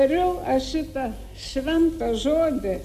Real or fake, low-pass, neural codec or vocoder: real; 14.4 kHz; none